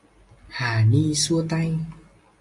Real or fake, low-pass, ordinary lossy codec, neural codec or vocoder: real; 10.8 kHz; AAC, 64 kbps; none